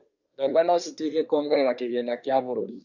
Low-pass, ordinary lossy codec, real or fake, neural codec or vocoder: 7.2 kHz; none; fake; codec, 24 kHz, 1 kbps, SNAC